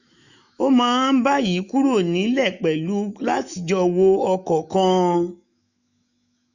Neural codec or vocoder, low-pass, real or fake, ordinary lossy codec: autoencoder, 48 kHz, 128 numbers a frame, DAC-VAE, trained on Japanese speech; 7.2 kHz; fake; none